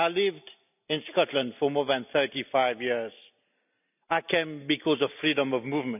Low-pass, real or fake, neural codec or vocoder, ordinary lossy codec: 3.6 kHz; real; none; none